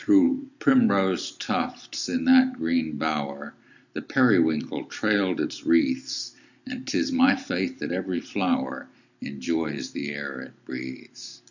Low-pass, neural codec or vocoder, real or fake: 7.2 kHz; none; real